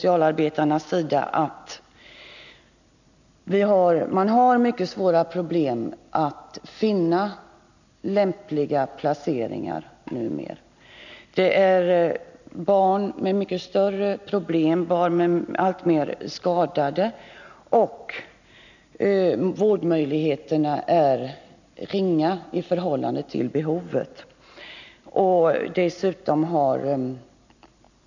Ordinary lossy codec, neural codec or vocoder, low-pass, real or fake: none; none; 7.2 kHz; real